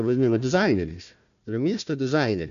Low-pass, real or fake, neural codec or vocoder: 7.2 kHz; fake; codec, 16 kHz, 1 kbps, FunCodec, trained on Chinese and English, 50 frames a second